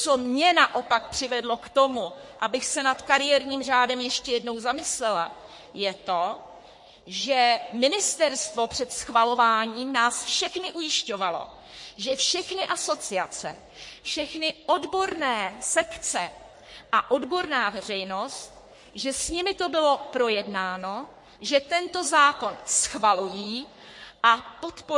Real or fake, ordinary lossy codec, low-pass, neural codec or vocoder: fake; MP3, 48 kbps; 10.8 kHz; codec, 44.1 kHz, 3.4 kbps, Pupu-Codec